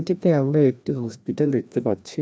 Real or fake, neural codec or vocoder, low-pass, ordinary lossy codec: fake; codec, 16 kHz, 1 kbps, FreqCodec, larger model; none; none